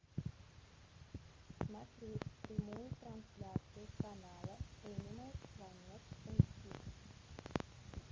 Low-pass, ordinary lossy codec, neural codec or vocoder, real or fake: 7.2 kHz; Opus, 32 kbps; none; real